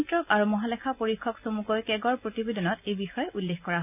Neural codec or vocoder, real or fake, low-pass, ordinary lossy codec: none; real; 3.6 kHz; AAC, 32 kbps